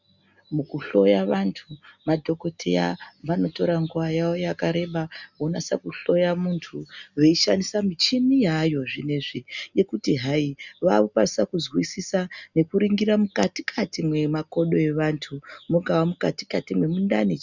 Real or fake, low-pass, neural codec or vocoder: real; 7.2 kHz; none